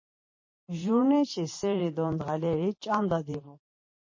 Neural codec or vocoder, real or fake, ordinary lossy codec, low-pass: vocoder, 44.1 kHz, 128 mel bands every 512 samples, BigVGAN v2; fake; MP3, 32 kbps; 7.2 kHz